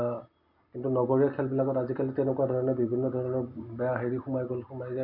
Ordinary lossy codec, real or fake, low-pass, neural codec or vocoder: none; real; 5.4 kHz; none